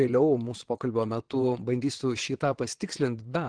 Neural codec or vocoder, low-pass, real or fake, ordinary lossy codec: vocoder, 22.05 kHz, 80 mel bands, WaveNeXt; 9.9 kHz; fake; Opus, 16 kbps